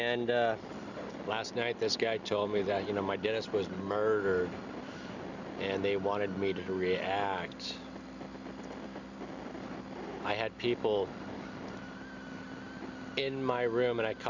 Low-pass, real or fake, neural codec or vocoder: 7.2 kHz; real; none